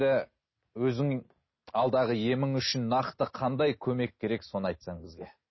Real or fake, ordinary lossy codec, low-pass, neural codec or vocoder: real; MP3, 24 kbps; 7.2 kHz; none